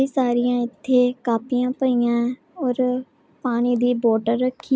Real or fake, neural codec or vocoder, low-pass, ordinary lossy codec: real; none; none; none